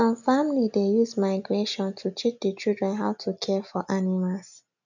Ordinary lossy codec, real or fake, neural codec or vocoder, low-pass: none; real; none; 7.2 kHz